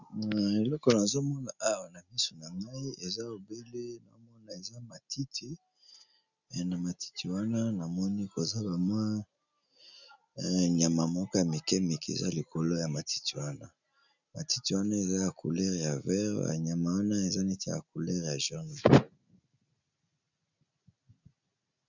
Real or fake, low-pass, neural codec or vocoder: real; 7.2 kHz; none